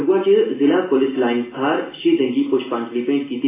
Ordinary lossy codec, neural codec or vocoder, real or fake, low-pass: AAC, 16 kbps; none; real; 3.6 kHz